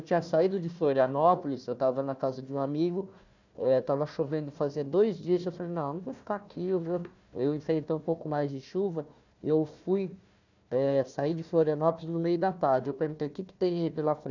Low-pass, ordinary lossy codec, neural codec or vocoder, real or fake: 7.2 kHz; none; codec, 16 kHz, 1 kbps, FunCodec, trained on Chinese and English, 50 frames a second; fake